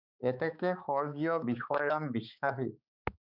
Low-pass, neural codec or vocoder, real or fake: 5.4 kHz; codec, 16 kHz, 4 kbps, X-Codec, HuBERT features, trained on balanced general audio; fake